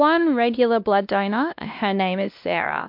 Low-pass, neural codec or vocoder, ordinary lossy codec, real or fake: 5.4 kHz; codec, 16 kHz, 1 kbps, X-Codec, WavLM features, trained on Multilingual LibriSpeech; MP3, 48 kbps; fake